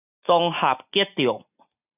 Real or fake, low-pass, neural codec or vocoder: real; 3.6 kHz; none